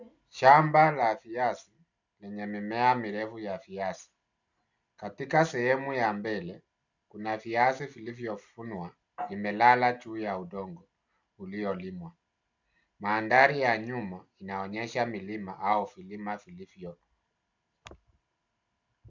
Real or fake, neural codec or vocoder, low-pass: real; none; 7.2 kHz